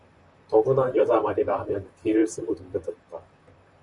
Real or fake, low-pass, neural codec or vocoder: fake; 10.8 kHz; vocoder, 44.1 kHz, 128 mel bands, Pupu-Vocoder